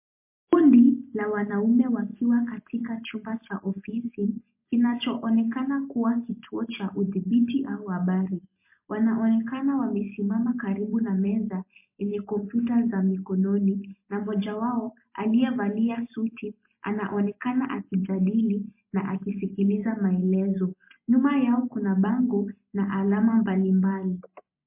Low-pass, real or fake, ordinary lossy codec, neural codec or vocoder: 3.6 kHz; real; MP3, 24 kbps; none